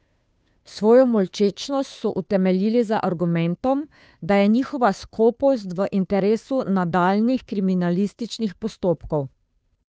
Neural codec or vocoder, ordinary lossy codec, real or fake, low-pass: codec, 16 kHz, 2 kbps, FunCodec, trained on Chinese and English, 25 frames a second; none; fake; none